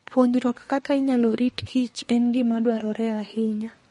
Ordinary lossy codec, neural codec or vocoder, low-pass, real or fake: MP3, 48 kbps; codec, 24 kHz, 1 kbps, SNAC; 10.8 kHz; fake